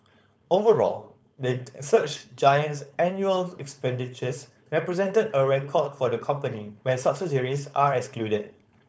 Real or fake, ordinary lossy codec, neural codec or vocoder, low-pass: fake; none; codec, 16 kHz, 4.8 kbps, FACodec; none